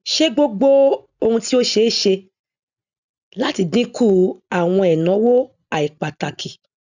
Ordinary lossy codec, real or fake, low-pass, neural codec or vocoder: none; real; 7.2 kHz; none